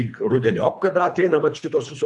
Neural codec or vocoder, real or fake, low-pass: codec, 24 kHz, 3 kbps, HILCodec; fake; 10.8 kHz